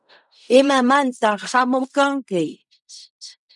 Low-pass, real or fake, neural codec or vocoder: 10.8 kHz; fake; codec, 16 kHz in and 24 kHz out, 0.4 kbps, LongCat-Audio-Codec, fine tuned four codebook decoder